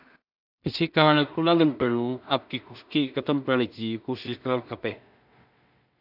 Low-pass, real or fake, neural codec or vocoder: 5.4 kHz; fake; codec, 16 kHz in and 24 kHz out, 0.4 kbps, LongCat-Audio-Codec, two codebook decoder